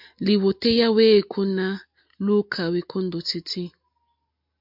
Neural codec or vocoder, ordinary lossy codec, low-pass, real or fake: none; MP3, 48 kbps; 5.4 kHz; real